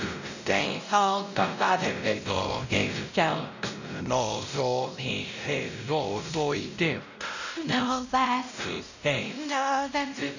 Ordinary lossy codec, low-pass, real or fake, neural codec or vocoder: none; 7.2 kHz; fake; codec, 16 kHz, 0.5 kbps, X-Codec, WavLM features, trained on Multilingual LibriSpeech